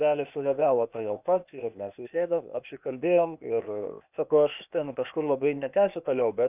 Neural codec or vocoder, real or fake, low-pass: codec, 16 kHz, 0.8 kbps, ZipCodec; fake; 3.6 kHz